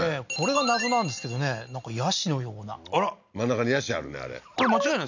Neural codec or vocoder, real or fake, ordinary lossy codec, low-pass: none; real; none; 7.2 kHz